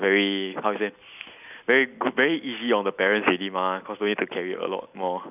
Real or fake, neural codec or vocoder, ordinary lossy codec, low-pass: real; none; none; 3.6 kHz